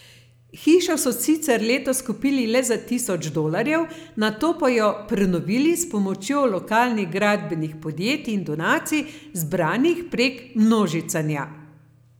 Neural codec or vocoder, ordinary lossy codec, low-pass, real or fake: none; none; none; real